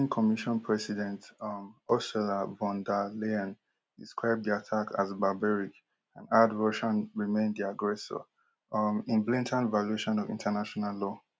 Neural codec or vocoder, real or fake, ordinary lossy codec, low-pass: none; real; none; none